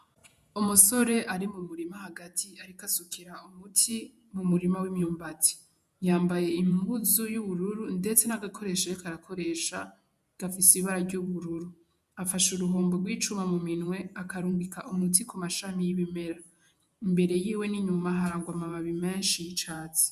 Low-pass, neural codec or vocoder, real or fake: 14.4 kHz; vocoder, 48 kHz, 128 mel bands, Vocos; fake